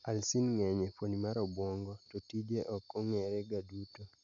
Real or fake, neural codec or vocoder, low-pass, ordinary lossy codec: real; none; 7.2 kHz; none